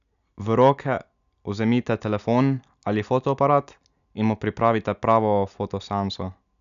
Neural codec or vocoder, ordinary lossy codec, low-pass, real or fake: none; none; 7.2 kHz; real